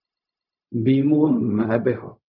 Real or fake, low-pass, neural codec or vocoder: fake; 5.4 kHz; codec, 16 kHz, 0.4 kbps, LongCat-Audio-Codec